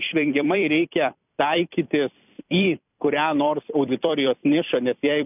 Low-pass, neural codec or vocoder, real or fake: 3.6 kHz; vocoder, 44.1 kHz, 128 mel bands every 256 samples, BigVGAN v2; fake